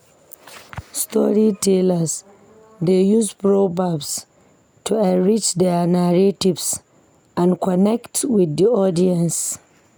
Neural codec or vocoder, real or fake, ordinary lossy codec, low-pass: none; real; none; none